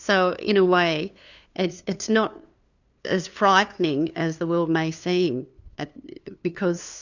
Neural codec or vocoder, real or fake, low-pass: codec, 16 kHz, 2 kbps, FunCodec, trained on LibriTTS, 25 frames a second; fake; 7.2 kHz